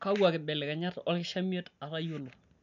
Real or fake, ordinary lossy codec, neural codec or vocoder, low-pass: real; none; none; 7.2 kHz